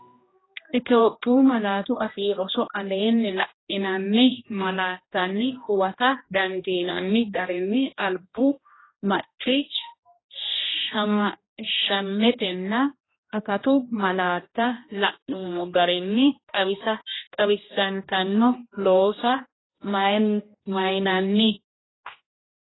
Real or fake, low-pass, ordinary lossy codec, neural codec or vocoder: fake; 7.2 kHz; AAC, 16 kbps; codec, 16 kHz, 1 kbps, X-Codec, HuBERT features, trained on general audio